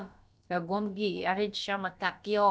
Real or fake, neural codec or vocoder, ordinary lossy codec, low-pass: fake; codec, 16 kHz, about 1 kbps, DyCAST, with the encoder's durations; none; none